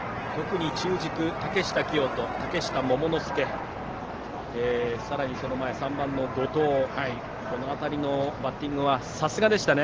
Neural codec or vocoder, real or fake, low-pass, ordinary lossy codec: none; real; 7.2 kHz; Opus, 16 kbps